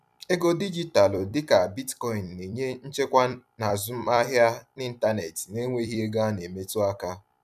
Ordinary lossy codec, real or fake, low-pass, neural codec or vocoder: none; fake; 14.4 kHz; vocoder, 44.1 kHz, 128 mel bands every 256 samples, BigVGAN v2